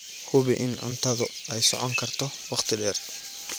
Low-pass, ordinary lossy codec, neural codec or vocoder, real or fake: none; none; vocoder, 44.1 kHz, 128 mel bands every 512 samples, BigVGAN v2; fake